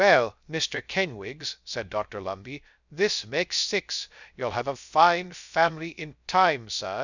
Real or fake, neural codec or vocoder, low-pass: fake; codec, 16 kHz, 0.3 kbps, FocalCodec; 7.2 kHz